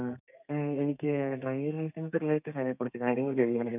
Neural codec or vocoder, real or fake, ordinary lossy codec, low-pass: codec, 32 kHz, 1.9 kbps, SNAC; fake; none; 3.6 kHz